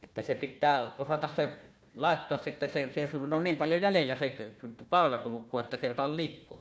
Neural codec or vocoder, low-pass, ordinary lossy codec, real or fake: codec, 16 kHz, 1 kbps, FunCodec, trained on Chinese and English, 50 frames a second; none; none; fake